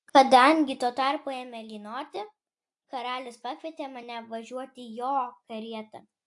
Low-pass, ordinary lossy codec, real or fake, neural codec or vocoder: 10.8 kHz; AAC, 64 kbps; real; none